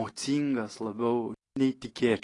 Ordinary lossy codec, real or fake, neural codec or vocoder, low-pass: AAC, 32 kbps; real; none; 10.8 kHz